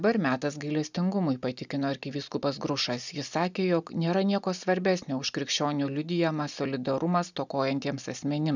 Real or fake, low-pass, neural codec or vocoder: real; 7.2 kHz; none